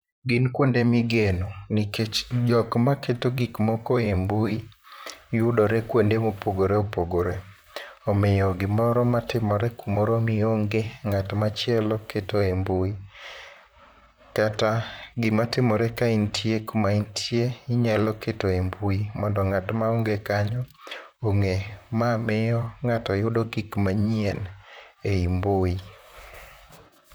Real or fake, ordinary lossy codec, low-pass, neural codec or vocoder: fake; none; none; vocoder, 44.1 kHz, 128 mel bands, Pupu-Vocoder